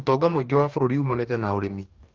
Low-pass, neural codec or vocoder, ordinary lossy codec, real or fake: 7.2 kHz; codec, 44.1 kHz, 2.6 kbps, DAC; Opus, 32 kbps; fake